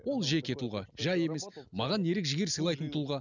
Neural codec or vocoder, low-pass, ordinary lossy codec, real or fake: none; 7.2 kHz; none; real